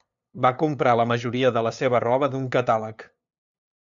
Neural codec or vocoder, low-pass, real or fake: codec, 16 kHz, 2 kbps, FunCodec, trained on LibriTTS, 25 frames a second; 7.2 kHz; fake